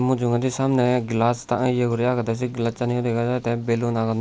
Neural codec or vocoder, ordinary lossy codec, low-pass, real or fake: none; none; none; real